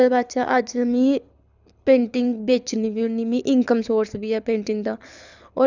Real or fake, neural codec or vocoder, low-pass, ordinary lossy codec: fake; codec, 24 kHz, 6 kbps, HILCodec; 7.2 kHz; none